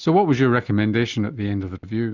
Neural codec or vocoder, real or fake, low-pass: none; real; 7.2 kHz